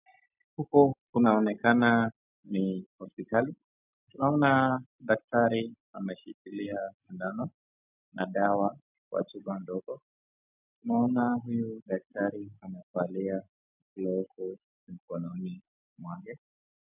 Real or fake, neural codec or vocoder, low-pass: real; none; 3.6 kHz